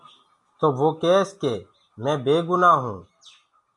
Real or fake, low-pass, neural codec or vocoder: real; 10.8 kHz; none